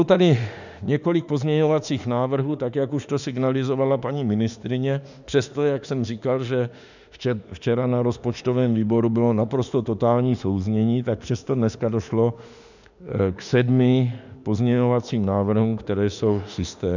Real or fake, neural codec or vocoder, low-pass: fake; autoencoder, 48 kHz, 32 numbers a frame, DAC-VAE, trained on Japanese speech; 7.2 kHz